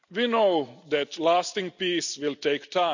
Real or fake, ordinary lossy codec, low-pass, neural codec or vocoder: real; none; 7.2 kHz; none